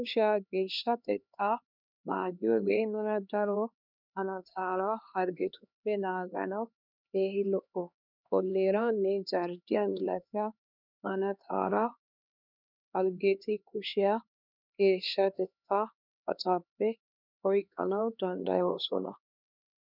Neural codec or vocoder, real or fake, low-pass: codec, 16 kHz, 2 kbps, X-Codec, HuBERT features, trained on LibriSpeech; fake; 5.4 kHz